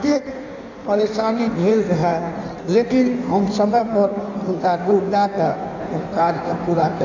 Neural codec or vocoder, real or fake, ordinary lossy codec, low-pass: codec, 16 kHz in and 24 kHz out, 1.1 kbps, FireRedTTS-2 codec; fake; none; 7.2 kHz